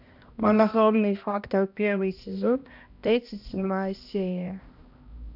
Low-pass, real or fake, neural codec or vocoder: 5.4 kHz; fake; codec, 16 kHz, 1 kbps, X-Codec, HuBERT features, trained on balanced general audio